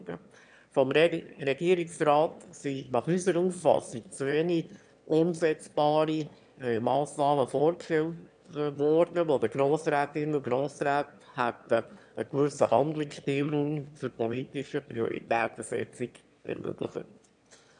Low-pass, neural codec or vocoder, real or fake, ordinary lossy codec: 9.9 kHz; autoencoder, 22.05 kHz, a latent of 192 numbers a frame, VITS, trained on one speaker; fake; none